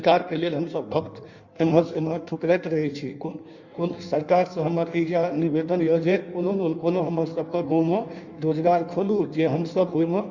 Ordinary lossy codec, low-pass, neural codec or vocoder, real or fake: Opus, 64 kbps; 7.2 kHz; codec, 16 kHz in and 24 kHz out, 1.1 kbps, FireRedTTS-2 codec; fake